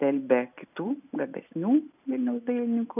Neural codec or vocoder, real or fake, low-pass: none; real; 3.6 kHz